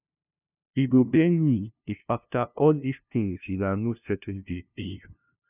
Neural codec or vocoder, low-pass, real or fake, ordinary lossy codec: codec, 16 kHz, 0.5 kbps, FunCodec, trained on LibriTTS, 25 frames a second; 3.6 kHz; fake; none